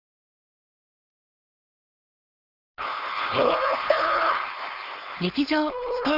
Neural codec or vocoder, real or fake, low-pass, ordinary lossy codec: codec, 16 kHz, 4.8 kbps, FACodec; fake; 5.4 kHz; none